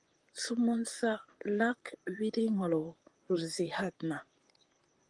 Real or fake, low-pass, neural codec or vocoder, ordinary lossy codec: fake; 10.8 kHz; vocoder, 44.1 kHz, 128 mel bands, Pupu-Vocoder; Opus, 24 kbps